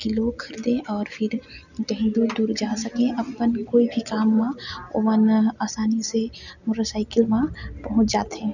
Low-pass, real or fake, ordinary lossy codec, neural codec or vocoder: 7.2 kHz; real; none; none